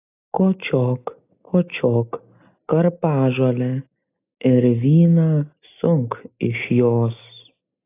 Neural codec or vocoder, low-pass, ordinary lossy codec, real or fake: none; 3.6 kHz; AAC, 24 kbps; real